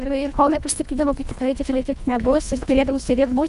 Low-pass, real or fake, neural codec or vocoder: 10.8 kHz; fake; codec, 24 kHz, 1.5 kbps, HILCodec